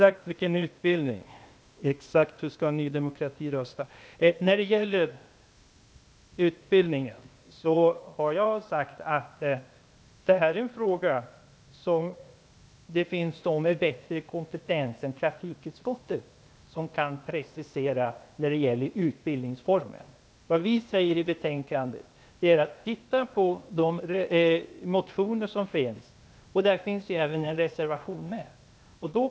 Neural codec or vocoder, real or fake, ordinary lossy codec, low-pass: codec, 16 kHz, 0.8 kbps, ZipCodec; fake; none; none